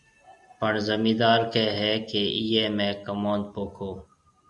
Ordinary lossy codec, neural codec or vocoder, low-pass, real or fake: Opus, 64 kbps; none; 10.8 kHz; real